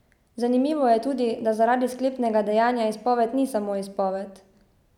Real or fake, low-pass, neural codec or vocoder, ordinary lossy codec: real; 19.8 kHz; none; none